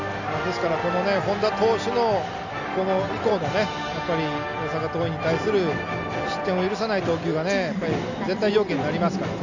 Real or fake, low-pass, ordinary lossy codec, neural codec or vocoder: real; 7.2 kHz; none; none